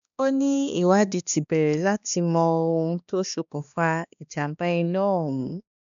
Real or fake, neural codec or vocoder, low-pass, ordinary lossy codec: fake; codec, 16 kHz, 2 kbps, X-Codec, HuBERT features, trained on balanced general audio; 7.2 kHz; MP3, 96 kbps